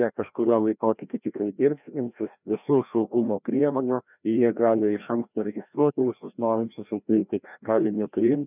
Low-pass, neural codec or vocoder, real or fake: 3.6 kHz; codec, 16 kHz, 1 kbps, FreqCodec, larger model; fake